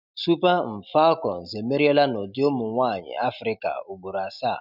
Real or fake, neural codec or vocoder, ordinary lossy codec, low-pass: real; none; none; 5.4 kHz